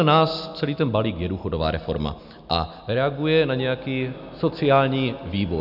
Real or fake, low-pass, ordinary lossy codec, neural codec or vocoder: real; 5.4 kHz; AAC, 48 kbps; none